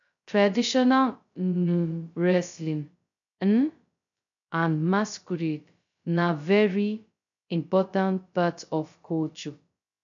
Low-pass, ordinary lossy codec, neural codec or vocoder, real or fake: 7.2 kHz; none; codec, 16 kHz, 0.2 kbps, FocalCodec; fake